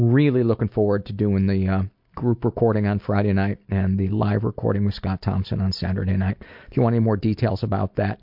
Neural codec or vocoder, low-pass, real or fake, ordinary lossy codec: none; 5.4 kHz; real; MP3, 48 kbps